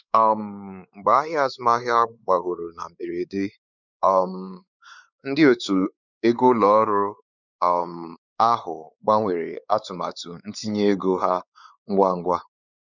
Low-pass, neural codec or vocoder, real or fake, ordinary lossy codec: 7.2 kHz; codec, 16 kHz, 4 kbps, X-Codec, WavLM features, trained on Multilingual LibriSpeech; fake; none